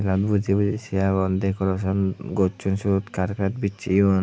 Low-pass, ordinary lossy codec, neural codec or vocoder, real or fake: none; none; none; real